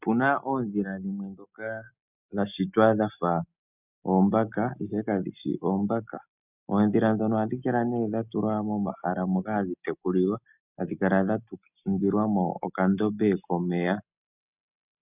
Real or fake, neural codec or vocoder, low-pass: real; none; 3.6 kHz